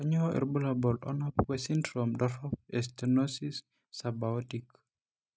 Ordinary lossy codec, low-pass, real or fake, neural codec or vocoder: none; none; real; none